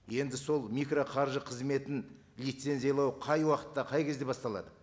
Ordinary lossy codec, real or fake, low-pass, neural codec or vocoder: none; real; none; none